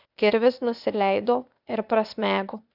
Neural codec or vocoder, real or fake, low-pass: codec, 16 kHz, 0.8 kbps, ZipCodec; fake; 5.4 kHz